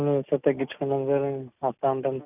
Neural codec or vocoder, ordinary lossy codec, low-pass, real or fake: none; none; 3.6 kHz; real